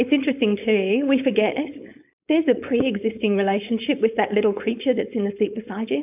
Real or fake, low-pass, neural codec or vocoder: fake; 3.6 kHz; codec, 16 kHz, 4.8 kbps, FACodec